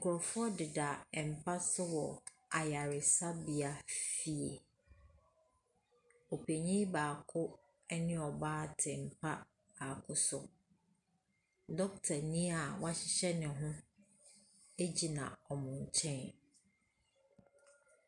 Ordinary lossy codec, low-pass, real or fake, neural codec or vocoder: MP3, 96 kbps; 10.8 kHz; real; none